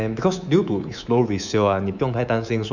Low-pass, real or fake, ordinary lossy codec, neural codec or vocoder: 7.2 kHz; fake; none; codec, 24 kHz, 3.1 kbps, DualCodec